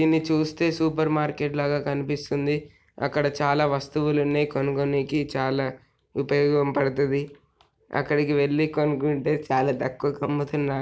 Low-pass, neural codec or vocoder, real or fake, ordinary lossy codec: none; none; real; none